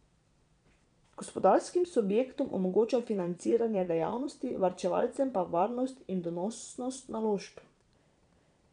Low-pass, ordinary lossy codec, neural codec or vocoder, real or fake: 9.9 kHz; none; vocoder, 22.05 kHz, 80 mel bands, Vocos; fake